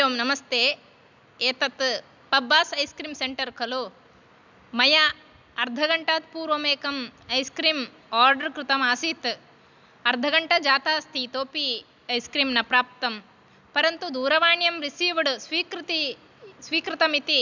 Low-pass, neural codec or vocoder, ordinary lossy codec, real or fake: 7.2 kHz; none; none; real